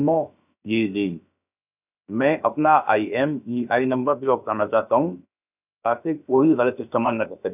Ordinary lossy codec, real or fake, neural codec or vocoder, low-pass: none; fake; codec, 16 kHz, about 1 kbps, DyCAST, with the encoder's durations; 3.6 kHz